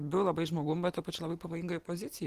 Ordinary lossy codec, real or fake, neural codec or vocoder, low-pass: Opus, 16 kbps; real; none; 14.4 kHz